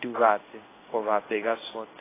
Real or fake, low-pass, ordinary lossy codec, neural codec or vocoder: fake; 3.6 kHz; AAC, 16 kbps; codec, 16 kHz in and 24 kHz out, 1 kbps, XY-Tokenizer